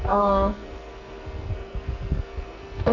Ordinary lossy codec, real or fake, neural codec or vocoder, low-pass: none; fake; codec, 44.1 kHz, 2.6 kbps, SNAC; 7.2 kHz